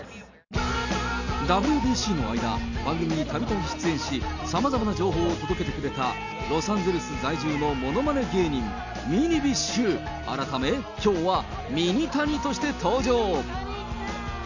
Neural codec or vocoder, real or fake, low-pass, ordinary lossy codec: none; real; 7.2 kHz; none